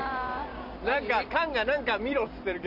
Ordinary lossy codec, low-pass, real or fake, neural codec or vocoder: none; 5.4 kHz; fake; vocoder, 44.1 kHz, 128 mel bands every 256 samples, BigVGAN v2